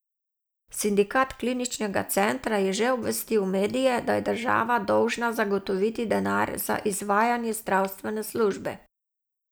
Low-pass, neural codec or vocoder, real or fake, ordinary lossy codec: none; none; real; none